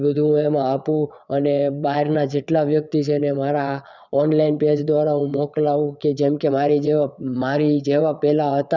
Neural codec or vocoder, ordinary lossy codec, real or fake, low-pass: vocoder, 22.05 kHz, 80 mel bands, WaveNeXt; none; fake; 7.2 kHz